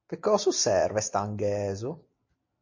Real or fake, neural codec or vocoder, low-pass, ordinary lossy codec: real; none; 7.2 kHz; MP3, 48 kbps